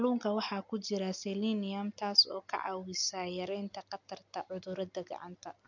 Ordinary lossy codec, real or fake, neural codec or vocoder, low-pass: none; real; none; 7.2 kHz